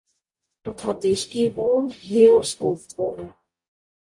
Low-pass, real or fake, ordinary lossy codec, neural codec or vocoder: 10.8 kHz; fake; MP3, 48 kbps; codec, 44.1 kHz, 0.9 kbps, DAC